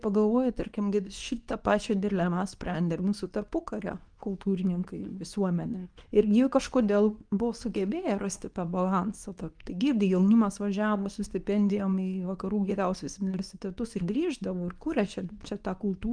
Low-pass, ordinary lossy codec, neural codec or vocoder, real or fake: 9.9 kHz; Opus, 32 kbps; codec, 24 kHz, 0.9 kbps, WavTokenizer, medium speech release version 2; fake